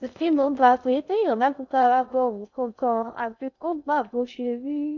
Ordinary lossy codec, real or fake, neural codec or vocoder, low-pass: none; fake; codec, 16 kHz in and 24 kHz out, 0.6 kbps, FocalCodec, streaming, 2048 codes; 7.2 kHz